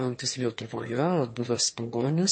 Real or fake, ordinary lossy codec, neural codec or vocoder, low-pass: fake; MP3, 32 kbps; autoencoder, 22.05 kHz, a latent of 192 numbers a frame, VITS, trained on one speaker; 9.9 kHz